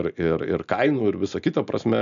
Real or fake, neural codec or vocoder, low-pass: real; none; 7.2 kHz